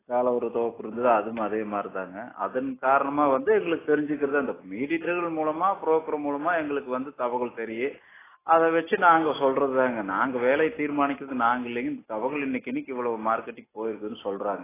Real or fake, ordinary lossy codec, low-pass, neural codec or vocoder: real; AAC, 16 kbps; 3.6 kHz; none